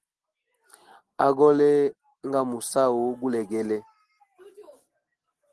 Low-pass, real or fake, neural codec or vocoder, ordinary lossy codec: 10.8 kHz; real; none; Opus, 16 kbps